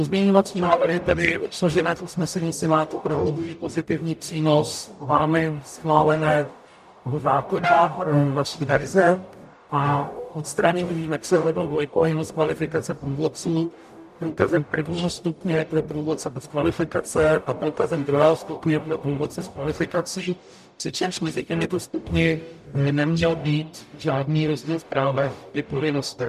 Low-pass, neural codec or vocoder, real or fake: 14.4 kHz; codec, 44.1 kHz, 0.9 kbps, DAC; fake